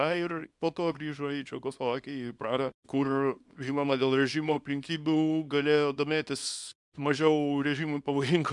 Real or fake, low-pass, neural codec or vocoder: fake; 10.8 kHz; codec, 24 kHz, 0.9 kbps, WavTokenizer, small release